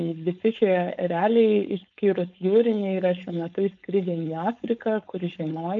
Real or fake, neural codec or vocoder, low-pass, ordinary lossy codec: fake; codec, 16 kHz, 4.8 kbps, FACodec; 7.2 kHz; MP3, 96 kbps